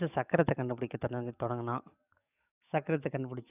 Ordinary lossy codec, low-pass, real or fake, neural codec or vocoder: none; 3.6 kHz; fake; codec, 44.1 kHz, 7.8 kbps, DAC